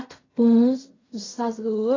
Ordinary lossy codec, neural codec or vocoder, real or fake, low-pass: AAC, 32 kbps; codec, 16 kHz in and 24 kHz out, 0.4 kbps, LongCat-Audio-Codec, fine tuned four codebook decoder; fake; 7.2 kHz